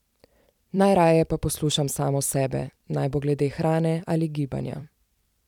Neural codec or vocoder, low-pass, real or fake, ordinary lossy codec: vocoder, 44.1 kHz, 128 mel bands every 256 samples, BigVGAN v2; 19.8 kHz; fake; none